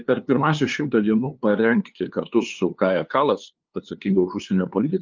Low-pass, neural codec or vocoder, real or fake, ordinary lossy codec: 7.2 kHz; codec, 16 kHz, 2 kbps, FunCodec, trained on LibriTTS, 25 frames a second; fake; Opus, 24 kbps